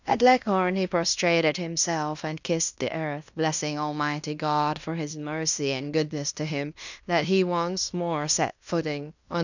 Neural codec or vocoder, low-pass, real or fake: codec, 16 kHz in and 24 kHz out, 0.9 kbps, LongCat-Audio-Codec, fine tuned four codebook decoder; 7.2 kHz; fake